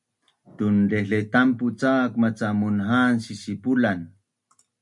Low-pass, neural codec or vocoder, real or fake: 10.8 kHz; none; real